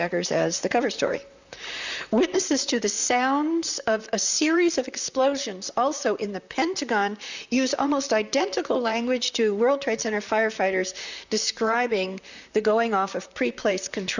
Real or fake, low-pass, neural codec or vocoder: fake; 7.2 kHz; vocoder, 44.1 kHz, 128 mel bands, Pupu-Vocoder